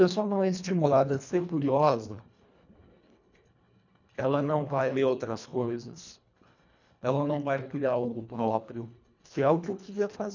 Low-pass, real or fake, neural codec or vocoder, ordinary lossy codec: 7.2 kHz; fake; codec, 24 kHz, 1.5 kbps, HILCodec; none